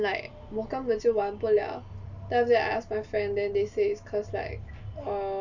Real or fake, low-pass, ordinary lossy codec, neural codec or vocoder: real; 7.2 kHz; none; none